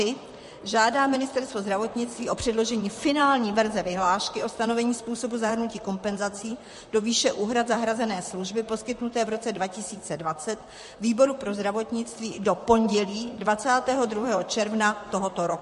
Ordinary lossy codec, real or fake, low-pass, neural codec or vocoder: MP3, 48 kbps; fake; 14.4 kHz; vocoder, 44.1 kHz, 128 mel bands, Pupu-Vocoder